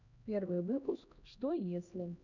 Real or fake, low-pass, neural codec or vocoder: fake; 7.2 kHz; codec, 16 kHz, 1 kbps, X-Codec, HuBERT features, trained on LibriSpeech